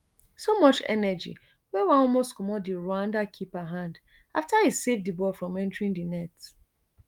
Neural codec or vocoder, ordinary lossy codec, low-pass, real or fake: autoencoder, 48 kHz, 128 numbers a frame, DAC-VAE, trained on Japanese speech; Opus, 32 kbps; 19.8 kHz; fake